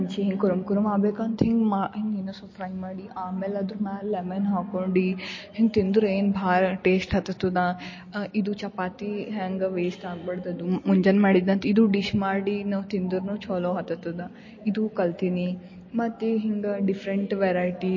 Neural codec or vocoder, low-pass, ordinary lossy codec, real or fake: none; 7.2 kHz; MP3, 32 kbps; real